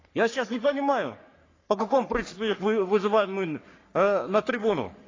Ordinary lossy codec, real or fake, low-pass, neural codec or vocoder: AAC, 32 kbps; fake; 7.2 kHz; codec, 44.1 kHz, 3.4 kbps, Pupu-Codec